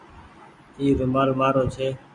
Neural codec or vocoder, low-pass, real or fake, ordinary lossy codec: none; 10.8 kHz; real; Opus, 64 kbps